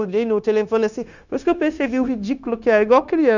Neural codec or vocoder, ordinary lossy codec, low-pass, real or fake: codec, 16 kHz, 0.9 kbps, LongCat-Audio-Codec; none; 7.2 kHz; fake